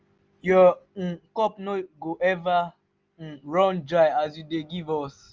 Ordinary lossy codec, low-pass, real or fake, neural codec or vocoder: Opus, 24 kbps; 7.2 kHz; real; none